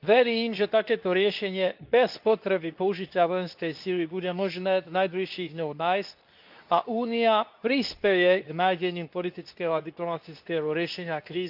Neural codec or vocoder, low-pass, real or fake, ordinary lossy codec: codec, 24 kHz, 0.9 kbps, WavTokenizer, medium speech release version 2; 5.4 kHz; fake; none